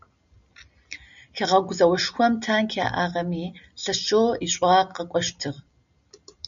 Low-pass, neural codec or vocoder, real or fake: 7.2 kHz; none; real